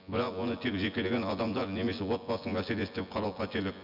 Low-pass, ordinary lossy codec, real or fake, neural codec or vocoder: 5.4 kHz; none; fake; vocoder, 24 kHz, 100 mel bands, Vocos